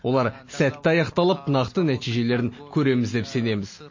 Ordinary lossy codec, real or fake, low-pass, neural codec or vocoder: MP3, 32 kbps; real; 7.2 kHz; none